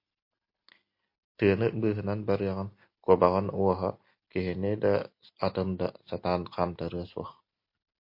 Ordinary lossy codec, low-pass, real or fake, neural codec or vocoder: MP3, 32 kbps; 5.4 kHz; real; none